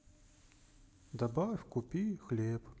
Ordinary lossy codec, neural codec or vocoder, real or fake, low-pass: none; none; real; none